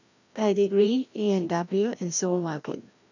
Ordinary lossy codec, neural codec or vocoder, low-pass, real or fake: none; codec, 16 kHz, 1 kbps, FreqCodec, larger model; 7.2 kHz; fake